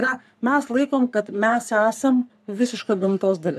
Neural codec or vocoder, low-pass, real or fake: codec, 44.1 kHz, 3.4 kbps, Pupu-Codec; 14.4 kHz; fake